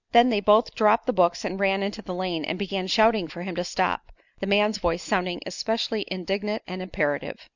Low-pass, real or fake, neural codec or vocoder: 7.2 kHz; real; none